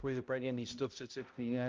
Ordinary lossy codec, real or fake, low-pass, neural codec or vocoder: Opus, 24 kbps; fake; 7.2 kHz; codec, 16 kHz, 0.5 kbps, X-Codec, HuBERT features, trained on balanced general audio